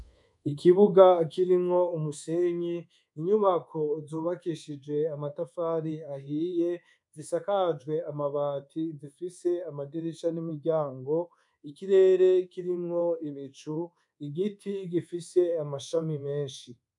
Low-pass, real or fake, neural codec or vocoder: 10.8 kHz; fake; codec, 24 kHz, 1.2 kbps, DualCodec